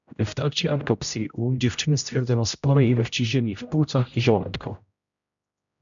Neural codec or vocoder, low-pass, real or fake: codec, 16 kHz, 0.5 kbps, X-Codec, HuBERT features, trained on general audio; 7.2 kHz; fake